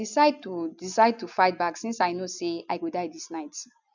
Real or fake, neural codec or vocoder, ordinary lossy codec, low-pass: real; none; none; 7.2 kHz